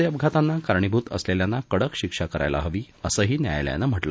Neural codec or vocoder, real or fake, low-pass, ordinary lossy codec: none; real; none; none